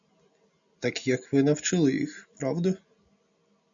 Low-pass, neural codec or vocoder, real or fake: 7.2 kHz; none; real